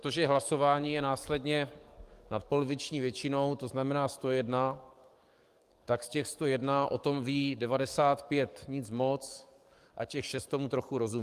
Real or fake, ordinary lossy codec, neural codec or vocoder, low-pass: fake; Opus, 32 kbps; codec, 44.1 kHz, 7.8 kbps, DAC; 14.4 kHz